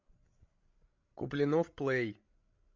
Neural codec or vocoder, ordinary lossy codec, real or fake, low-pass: codec, 16 kHz, 16 kbps, FreqCodec, larger model; MP3, 48 kbps; fake; 7.2 kHz